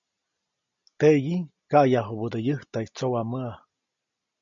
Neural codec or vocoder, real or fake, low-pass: none; real; 7.2 kHz